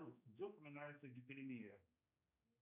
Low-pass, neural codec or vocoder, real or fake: 3.6 kHz; codec, 16 kHz, 2 kbps, X-Codec, HuBERT features, trained on general audio; fake